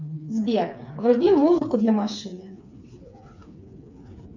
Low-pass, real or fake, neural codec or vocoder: 7.2 kHz; fake; codec, 16 kHz, 4 kbps, FreqCodec, smaller model